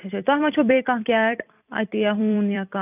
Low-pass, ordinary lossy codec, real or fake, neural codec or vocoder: 3.6 kHz; none; fake; vocoder, 44.1 kHz, 128 mel bands every 256 samples, BigVGAN v2